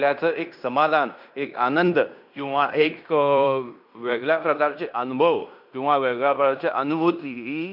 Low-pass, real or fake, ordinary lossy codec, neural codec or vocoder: 5.4 kHz; fake; none; codec, 16 kHz in and 24 kHz out, 0.9 kbps, LongCat-Audio-Codec, fine tuned four codebook decoder